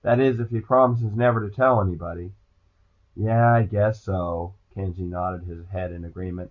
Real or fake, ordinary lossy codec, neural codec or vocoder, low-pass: real; Opus, 64 kbps; none; 7.2 kHz